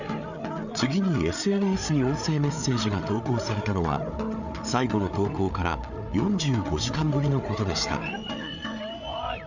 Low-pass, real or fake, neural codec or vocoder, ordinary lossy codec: 7.2 kHz; fake; codec, 16 kHz, 8 kbps, FreqCodec, larger model; none